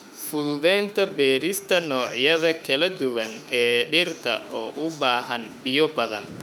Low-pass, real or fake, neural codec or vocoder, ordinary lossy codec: 19.8 kHz; fake; autoencoder, 48 kHz, 32 numbers a frame, DAC-VAE, trained on Japanese speech; none